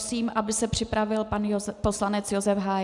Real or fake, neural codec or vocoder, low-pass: real; none; 10.8 kHz